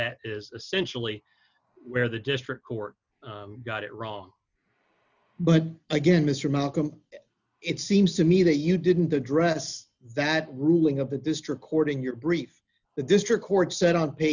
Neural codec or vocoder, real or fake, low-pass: none; real; 7.2 kHz